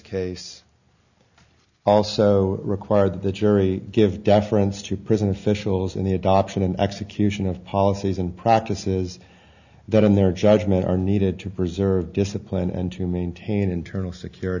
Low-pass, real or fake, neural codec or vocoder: 7.2 kHz; real; none